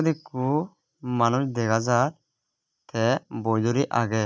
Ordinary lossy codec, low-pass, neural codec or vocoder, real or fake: none; none; none; real